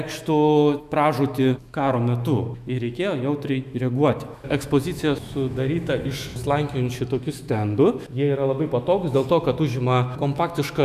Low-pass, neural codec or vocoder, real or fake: 14.4 kHz; autoencoder, 48 kHz, 128 numbers a frame, DAC-VAE, trained on Japanese speech; fake